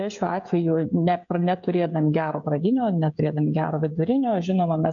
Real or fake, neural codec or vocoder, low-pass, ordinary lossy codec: fake; codec, 16 kHz, 16 kbps, FreqCodec, smaller model; 7.2 kHz; MP3, 64 kbps